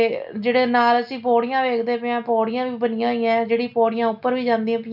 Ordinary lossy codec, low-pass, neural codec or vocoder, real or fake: none; 5.4 kHz; none; real